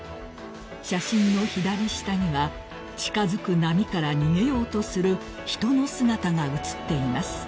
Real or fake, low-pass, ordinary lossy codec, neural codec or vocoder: real; none; none; none